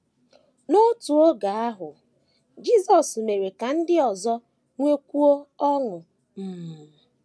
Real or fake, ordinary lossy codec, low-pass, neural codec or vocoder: real; none; none; none